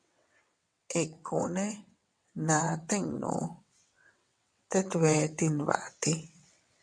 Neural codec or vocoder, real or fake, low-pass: vocoder, 22.05 kHz, 80 mel bands, WaveNeXt; fake; 9.9 kHz